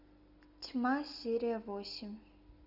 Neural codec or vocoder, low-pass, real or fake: none; 5.4 kHz; real